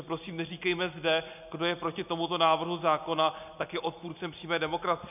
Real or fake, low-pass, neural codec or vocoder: real; 3.6 kHz; none